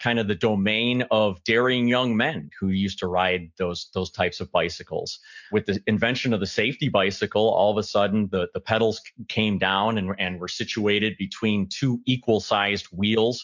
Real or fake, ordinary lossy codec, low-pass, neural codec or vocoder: real; MP3, 64 kbps; 7.2 kHz; none